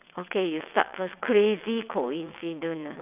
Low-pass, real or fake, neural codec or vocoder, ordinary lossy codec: 3.6 kHz; fake; vocoder, 22.05 kHz, 80 mel bands, WaveNeXt; none